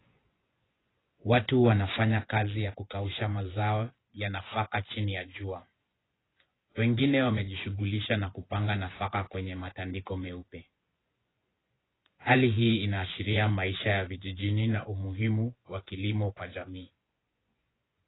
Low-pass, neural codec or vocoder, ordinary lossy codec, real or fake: 7.2 kHz; vocoder, 24 kHz, 100 mel bands, Vocos; AAC, 16 kbps; fake